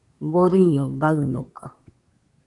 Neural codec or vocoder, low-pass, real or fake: codec, 24 kHz, 1 kbps, SNAC; 10.8 kHz; fake